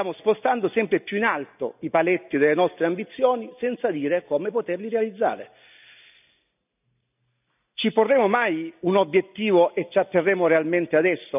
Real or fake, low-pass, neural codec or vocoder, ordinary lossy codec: real; 3.6 kHz; none; none